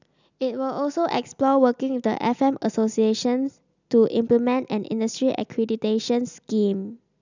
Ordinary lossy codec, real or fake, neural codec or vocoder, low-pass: none; real; none; 7.2 kHz